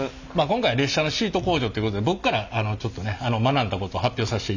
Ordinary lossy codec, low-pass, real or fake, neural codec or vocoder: none; 7.2 kHz; real; none